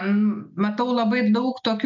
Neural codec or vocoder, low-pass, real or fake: none; 7.2 kHz; real